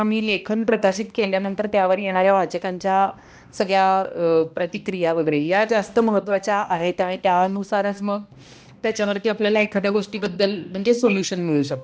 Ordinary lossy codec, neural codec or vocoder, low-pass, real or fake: none; codec, 16 kHz, 1 kbps, X-Codec, HuBERT features, trained on balanced general audio; none; fake